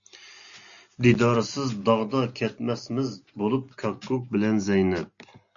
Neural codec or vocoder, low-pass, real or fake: none; 7.2 kHz; real